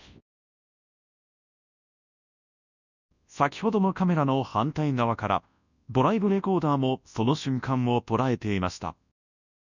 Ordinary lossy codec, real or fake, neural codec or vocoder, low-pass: none; fake; codec, 24 kHz, 0.9 kbps, WavTokenizer, large speech release; 7.2 kHz